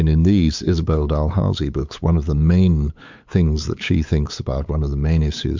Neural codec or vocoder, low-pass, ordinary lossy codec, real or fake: codec, 16 kHz, 8 kbps, FunCodec, trained on LibriTTS, 25 frames a second; 7.2 kHz; MP3, 64 kbps; fake